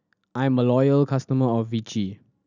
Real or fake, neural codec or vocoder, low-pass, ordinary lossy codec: real; none; 7.2 kHz; none